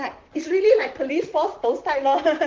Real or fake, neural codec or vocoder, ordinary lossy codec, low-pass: fake; vocoder, 44.1 kHz, 128 mel bands, Pupu-Vocoder; Opus, 16 kbps; 7.2 kHz